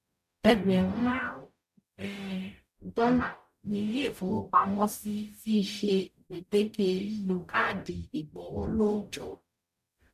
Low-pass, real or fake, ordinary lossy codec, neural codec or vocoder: 14.4 kHz; fake; MP3, 96 kbps; codec, 44.1 kHz, 0.9 kbps, DAC